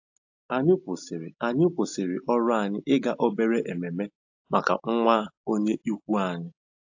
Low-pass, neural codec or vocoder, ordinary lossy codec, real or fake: 7.2 kHz; none; AAC, 48 kbps; real